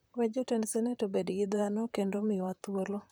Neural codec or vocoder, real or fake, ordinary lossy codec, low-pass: vocoder, 44.1 kHz, 128 mel bands, Pupu-Vocoder; fake; none; none